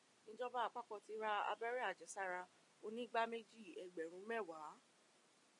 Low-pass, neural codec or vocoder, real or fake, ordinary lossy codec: 10.8 kHz; none; real; MP3, 48 kbps